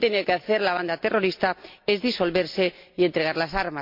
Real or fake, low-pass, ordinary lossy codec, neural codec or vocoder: real; 5.4 kHz; MP3, 48 kbps; none